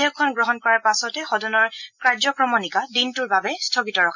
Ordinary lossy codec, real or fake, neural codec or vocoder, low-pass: none; real; none; 7.2 kHz